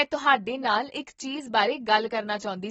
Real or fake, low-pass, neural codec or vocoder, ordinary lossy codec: real; 19.8 kHz; none; AAC, 24 kbps